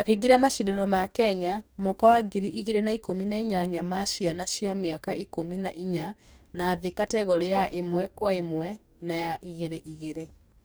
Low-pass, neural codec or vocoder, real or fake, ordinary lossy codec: none; codec, 44.1 kHz, 2.6 kbps, DAC; fake; none